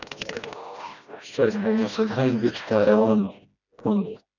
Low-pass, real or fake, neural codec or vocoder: 7.2 kHz; fake; codec, 16 kHz, 1 kbps, FreqCodec, smaller model